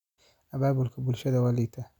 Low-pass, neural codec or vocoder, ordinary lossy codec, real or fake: 19.8 kHz; none; none; real